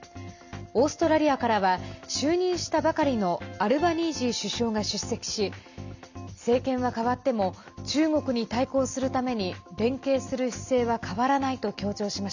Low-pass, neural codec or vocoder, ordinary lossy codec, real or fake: 7.2 kHz; none; none; real